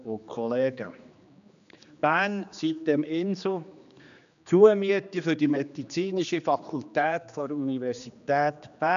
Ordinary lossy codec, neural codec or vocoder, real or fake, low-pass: MP3, 96 kbps; codec, 16 kHz, 2 kbps, X-Codec, HuBERT features, trained on general audio; fake; 7.2 kHz